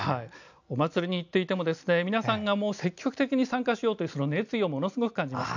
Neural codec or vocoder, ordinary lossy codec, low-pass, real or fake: none; none; 7.2 kHz; real